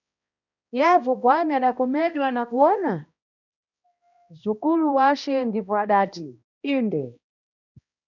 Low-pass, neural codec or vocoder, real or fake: 7.2 kHz; codec, 16 kHz, 1 kbps, X-Codec, HuBERT features, trained on balanced general audio; fake